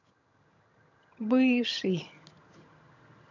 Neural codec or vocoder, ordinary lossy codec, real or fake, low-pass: vocoder, 22.05 kHz, 80 mel bands, HiFi-GAN; none; fake; 7.2 kHz